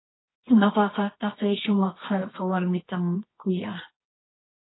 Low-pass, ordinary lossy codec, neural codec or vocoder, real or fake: 7.2 kHz; AAC, 16 kbps; codec, 24 kHz, 0.9 kbps, WavTokenizer, medium music audio release; fake